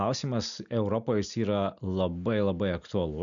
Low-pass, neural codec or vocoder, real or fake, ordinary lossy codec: 7.2 kHz; none; real; MP3, 96 kbps